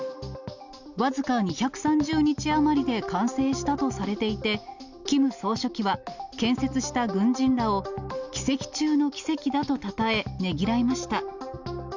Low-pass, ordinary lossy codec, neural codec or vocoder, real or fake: 7.2 kHz; none; none; real